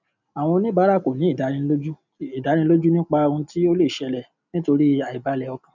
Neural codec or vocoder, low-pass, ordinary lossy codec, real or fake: none; 7.2 kHz; none; real